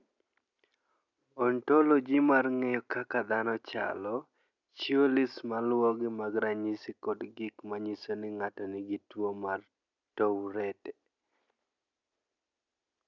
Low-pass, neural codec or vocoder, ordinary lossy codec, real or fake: 7.2 kHz; none; none; real